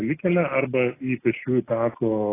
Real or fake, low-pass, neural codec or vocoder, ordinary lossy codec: real; 3.6 kHz; none; AAC, 16 kbps